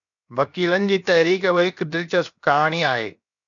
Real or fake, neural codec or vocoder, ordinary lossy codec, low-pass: fake; codec, 16 kHz, 0.7 kbps, FocalCodec; MP3, 96 kbps; 7.2 kHz